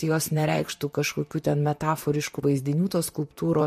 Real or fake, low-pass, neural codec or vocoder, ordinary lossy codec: fake; 14.4 kHz; vocoder, 44.1 kHz, 128 mel bands, Pupu-Vocoder; MP3, 64 kbps